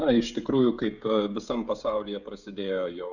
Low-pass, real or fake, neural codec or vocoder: 7.2 kHz; fake; codec, 16 kHz, 16 kbps, FreqCodec, smaller model